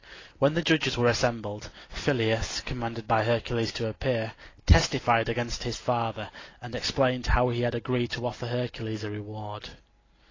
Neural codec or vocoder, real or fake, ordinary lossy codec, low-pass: none; real; AAC, 32 kbps; 7.2 kHz